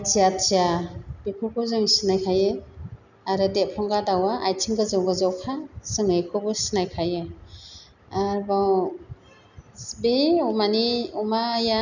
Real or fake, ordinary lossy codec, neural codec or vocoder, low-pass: real; none; none; 7.2 kHz